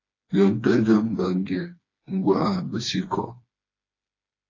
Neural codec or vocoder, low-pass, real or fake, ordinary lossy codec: codec, 16 kHz, 2 kbps, FreqCodec, smaller model; 7.2 kHz; fake; AAC, 32 kbps